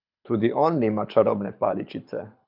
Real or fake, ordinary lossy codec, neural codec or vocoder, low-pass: fake; none; codec, 24 kHz, 6 kbps, HILCodec; 5.4 kHz